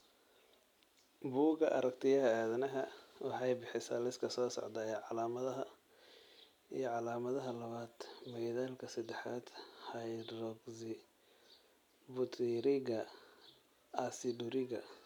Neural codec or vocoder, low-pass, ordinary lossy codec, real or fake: none; 19.8 kHz; none; real